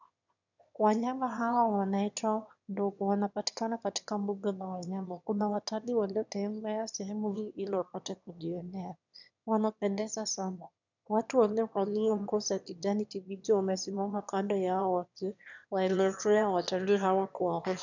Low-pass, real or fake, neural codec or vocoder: 7.2 kHz; fake; autoencoder, 22.05 kHz, a latent of 192 numbers a frame, VITS, trained on one speaker